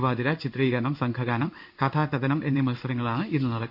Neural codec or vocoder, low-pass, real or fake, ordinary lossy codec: codec, 16 kHz in and 24 kHz out, 1 kbps, XY-Tokenizer; 5.4 kHz; fake; none